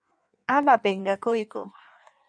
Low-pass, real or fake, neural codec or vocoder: 9.9 kHz; fake; codec, 16 kHz in and 24 kHz out, 1.1 kbps, FireRedTTS-2 codec